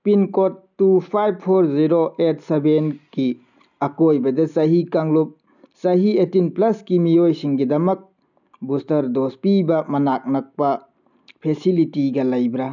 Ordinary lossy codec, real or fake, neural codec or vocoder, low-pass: none; real; none; 7.2 kHz